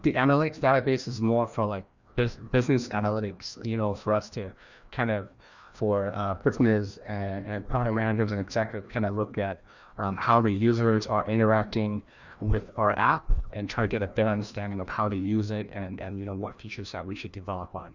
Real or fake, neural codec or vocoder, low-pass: fake; codec, 16 kHz, 1 kbps, FreqCodec, larger model; 7.2 kHz